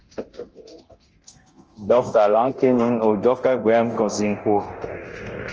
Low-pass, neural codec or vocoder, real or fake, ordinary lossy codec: 7.2 kHz; codec, 24 kHz, 0.9 kbps, DualCodec; fake; Opus, 24 kbps